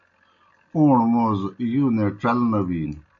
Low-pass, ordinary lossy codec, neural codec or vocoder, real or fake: 7.2 kHz; MP3, 48 kbps; none; real